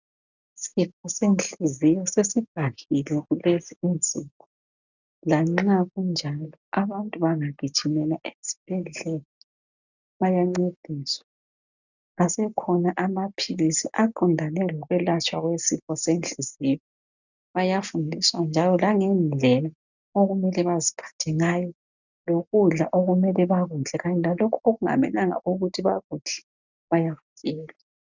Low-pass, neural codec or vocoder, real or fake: 7.2 kHz; none; real